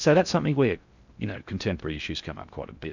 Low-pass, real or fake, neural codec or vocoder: 7.2 kHz; fake; codec, 16 kHz in and 24 kHz out, 0.6 kbps, FocalCodec, streaming, 4096 codes